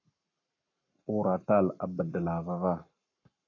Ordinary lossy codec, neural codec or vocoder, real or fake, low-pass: AAC, 48 kbps; codec, 44.1 kHz, 7.8 kbps, Pupu-Codec; fake; 7.2 kHz